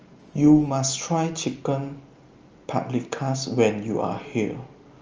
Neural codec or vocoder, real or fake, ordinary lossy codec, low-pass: none; real; Opus, 24 kbps; 7.2 kHz